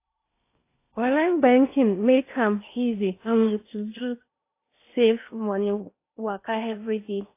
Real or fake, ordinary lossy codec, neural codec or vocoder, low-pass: fake; AAC, 24 kbps; codec, 16 kHz in and 24 kHz out, 0.8 kbps, FocalCodec, streaming, 65536 codes; 3.6 kHz